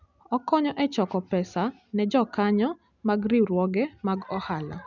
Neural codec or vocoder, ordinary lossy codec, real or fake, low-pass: none; none; real; 7.2 kHz